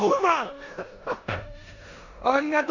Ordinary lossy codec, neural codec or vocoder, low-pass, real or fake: none; codec, 16 kHz in and 24 kHz out, 0.9 kbps, LongCat-Audio-Codec, four codebook decoder; 7.2 kHz; fake